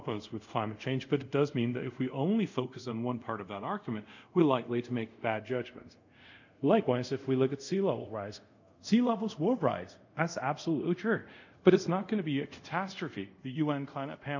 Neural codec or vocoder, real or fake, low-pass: codec, 24 kHz, 0.5 kbps, DualCodec; fake; 7.2 kHz